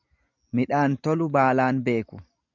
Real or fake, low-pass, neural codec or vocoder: real; 7.2 kHz; none